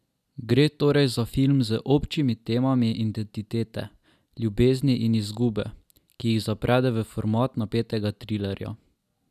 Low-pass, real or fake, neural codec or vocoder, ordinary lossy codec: 14.4 kHz; real; none; none